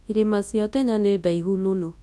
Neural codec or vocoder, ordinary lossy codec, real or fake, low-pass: codec, 24 kHz, 0.9 kbps, WavTokenizer, large speech release; none; fake; none